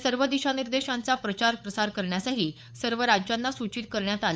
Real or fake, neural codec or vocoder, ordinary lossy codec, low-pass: fake; codec, 16 kHz, 8 kbps, FunCodec, trained on LibriTTS, 25 frames a second; none; none